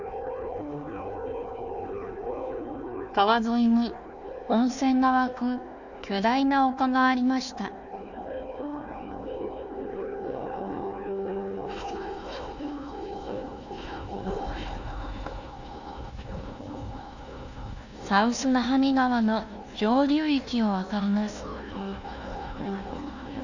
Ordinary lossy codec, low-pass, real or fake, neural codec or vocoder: none; 7.2 kHz; fake; codec, 16 kHz, 1 kbps, FunCodec, trained on Chinese and English, 50 frames a second